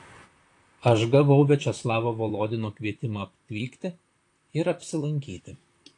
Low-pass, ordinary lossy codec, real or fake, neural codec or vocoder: 10.8 kHz; AAC, 48 kbps; fake; vocoder, 44.1 kHz, 128 mel bands, Pupu-Vocoder